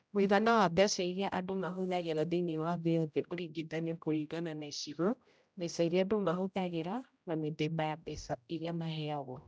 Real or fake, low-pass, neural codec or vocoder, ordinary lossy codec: fake; none; codec, 16 kHz, 0.5 kbps, X-Codec, HuBERT features, trained on general audio; none